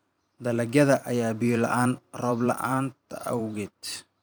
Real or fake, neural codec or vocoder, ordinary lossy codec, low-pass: fake; vocoder, 44.1 kHz, 128 mel bands every 512 samples, BigVGAN v2; none; none